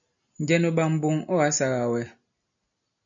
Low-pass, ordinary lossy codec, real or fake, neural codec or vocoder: 7.2 kHz; MP3, 96 kbps; real; none